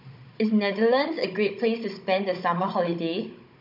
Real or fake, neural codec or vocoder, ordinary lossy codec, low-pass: fake; codec, 16 kHz, 16 kbps, FunCodec, trained on Chinese and English, 50 frames a second; MP3, 48 kbps; 5.4 kHz